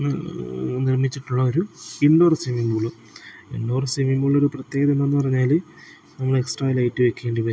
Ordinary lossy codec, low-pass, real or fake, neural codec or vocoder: none; none; real; none